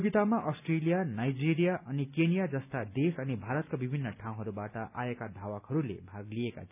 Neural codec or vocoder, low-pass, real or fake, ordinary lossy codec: none; 3.6 kHz; real; none